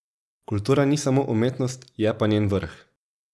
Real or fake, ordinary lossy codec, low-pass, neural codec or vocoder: real; none; none; none